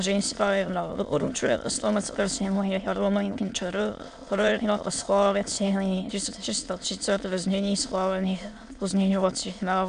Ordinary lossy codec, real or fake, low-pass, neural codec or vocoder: AAC, 64 kbps; fake; 9.9 kHz; autoencoder, 22.05 kHz, a latent of 192 numbers a frame, VITS, trained on many speakers